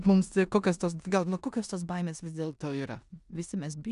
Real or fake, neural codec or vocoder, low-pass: fake; codec, 16 kHz in and 24 kHz out, 0.9 kbps, LongCat-Audio-Codec, four codebook decoder; 10.8 kHz